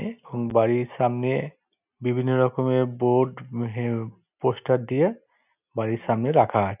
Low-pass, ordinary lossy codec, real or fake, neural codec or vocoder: 3.6 kHz; none; real; none